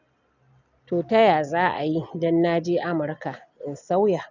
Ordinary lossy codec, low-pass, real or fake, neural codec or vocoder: none; 7.2 kHz; real; none